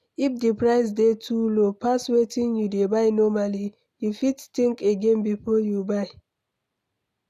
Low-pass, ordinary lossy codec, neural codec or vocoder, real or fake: 14.4 kHz; none; none; real